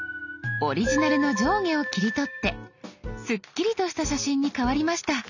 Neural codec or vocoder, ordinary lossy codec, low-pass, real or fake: none; none; 7.2 kHz; real